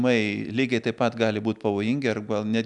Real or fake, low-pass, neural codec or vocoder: real; 10.8 kHz; none